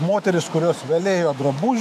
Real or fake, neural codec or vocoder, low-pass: fake; autoencoder, 48 kHz, 128 numbers a frame, DAC-VAE, trained on Japanese speech; 14.4 kHz